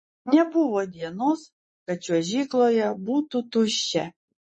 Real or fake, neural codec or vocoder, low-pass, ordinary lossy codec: fake; vocoder, 24 kHz, 100 mel bands, Vocos; 10.8 kHz; MP3, 32 kbps